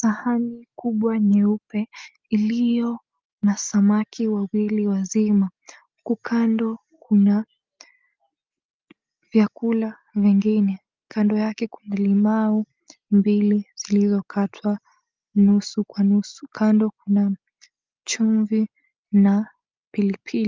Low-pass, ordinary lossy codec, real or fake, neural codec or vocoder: 7.2 kHz; Opus, 24 kbps; real; none